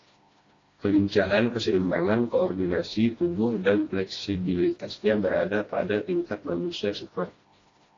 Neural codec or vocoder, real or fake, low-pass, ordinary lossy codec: codec, 16 kHz, 1 kbps, FreqCodec, smaller model; fake; 7.2 kHz; AAC, 32 kbps